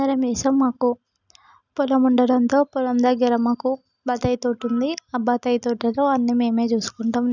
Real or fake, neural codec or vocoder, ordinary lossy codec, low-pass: real; none; none; 7.2 kHz